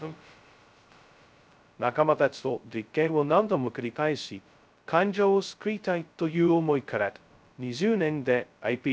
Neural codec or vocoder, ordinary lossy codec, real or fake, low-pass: codec, 16 kHz, 0.2 kbps, FocalCodec; none; fake; none